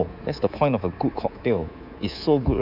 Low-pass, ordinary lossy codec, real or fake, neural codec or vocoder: 5.4 kHz; none; fake; codec, 24 kHz, 3.1 kbps, DualCodec